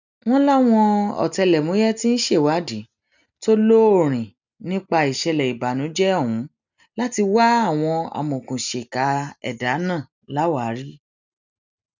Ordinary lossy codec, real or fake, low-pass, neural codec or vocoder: none; real; 7.2 kHz; none